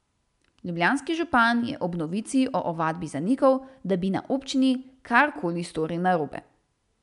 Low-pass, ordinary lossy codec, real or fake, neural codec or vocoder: 10.8 kHz; none; real; none